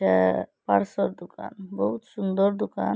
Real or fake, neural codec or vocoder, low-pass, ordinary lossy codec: real; none; none; none